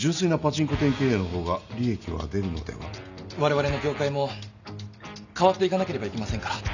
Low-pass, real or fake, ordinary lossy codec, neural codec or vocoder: 7.2 kHz; real; none; none